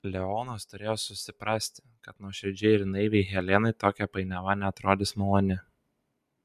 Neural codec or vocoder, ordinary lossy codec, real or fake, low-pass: none; MP3, 96 kbps; real; 14.4 kHz